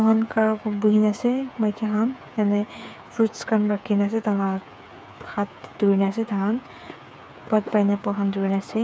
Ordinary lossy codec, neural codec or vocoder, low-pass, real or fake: none; codec, 16 kHz, 8 kbps, FreqCodec, smaller model; none; fake